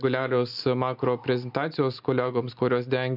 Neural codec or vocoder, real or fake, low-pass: none; real; 5.4 kHz